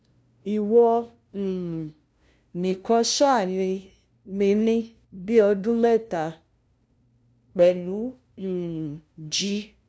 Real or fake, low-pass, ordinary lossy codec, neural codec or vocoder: fake; none; none; codec, 16 kHz, 0.5 kbps, FunCodec, trained on LibriTTS, 25 frames a second